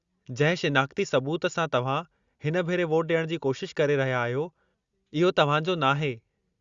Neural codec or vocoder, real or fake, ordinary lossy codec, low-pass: none; real; Opus, 64 kbps; 7.2 kHz